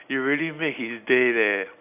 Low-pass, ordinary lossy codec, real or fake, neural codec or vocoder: 3.6 kHz; AAC, 32 kbps; real; none